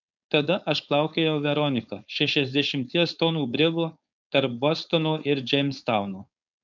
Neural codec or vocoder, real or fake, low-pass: codec, 16 kHz, 4.8 kbps, FACodec; fake; 7.2 kHz